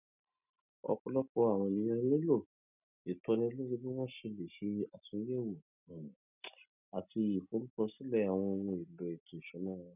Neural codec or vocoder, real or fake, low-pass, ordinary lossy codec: none; real; 3.6 kHz; none